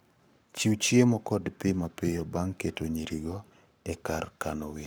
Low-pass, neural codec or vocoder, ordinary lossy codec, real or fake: none; codec, 44.1 kHz, 7.8 kbps, Pupu-Codec; none; fake